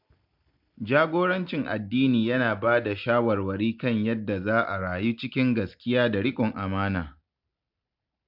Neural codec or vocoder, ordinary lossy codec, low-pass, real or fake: none; MP3, 48 kbps; 5.4 kHz; real